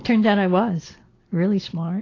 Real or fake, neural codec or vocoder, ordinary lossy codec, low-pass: real; none; AAC, 32 kbps; 7.2 kHz